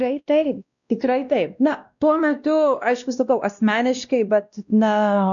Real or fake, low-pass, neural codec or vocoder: fake; 7.2 kHz; codec, 16 kHz, 1 kbps, X-Codec, WavLM features, trained on Multilingual LibriSpeech